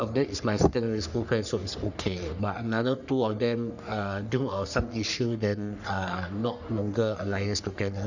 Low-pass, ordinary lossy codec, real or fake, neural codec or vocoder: 7.2 kHz; none; fake; codec, 44.1 kHz, 3.4 kbps, Pupu-Codec